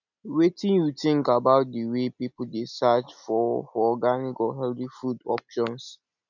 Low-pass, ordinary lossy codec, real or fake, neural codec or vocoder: 7.2 kHz; none; real; none